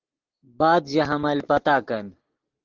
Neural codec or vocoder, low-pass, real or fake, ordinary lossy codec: none; 7.2 kHz; real; Opus, 16 kbps